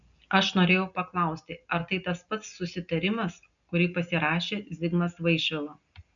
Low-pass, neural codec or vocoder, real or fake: 7.2 kHz; none; real